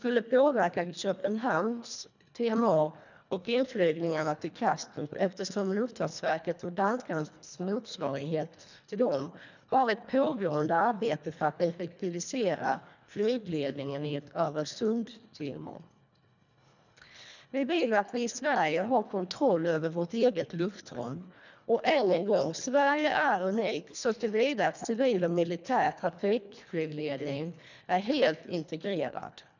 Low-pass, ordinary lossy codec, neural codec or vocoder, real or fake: 7.2 kHz; none; codec, 24 kHz, 1.5 kbps, HILCodec; fake